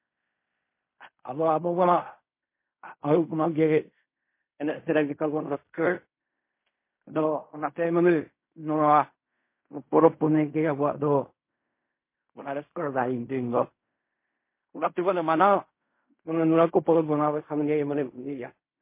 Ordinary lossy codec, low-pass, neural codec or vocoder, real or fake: MP3, 24 kbps; 3.6 kHz; codec, 16 kHz in and 24 kHz out, 0.4 kbps, LongCat-Audio-Codec, fine tuned four codebook decoder; fake